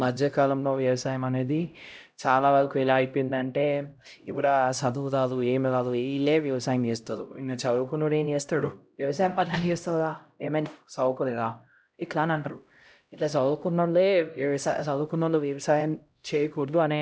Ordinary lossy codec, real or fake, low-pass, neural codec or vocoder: none; fake; none; codec, 16 kHz, 0.5 kbps, X-Codec, HuBERT features, trained on LibriSpeech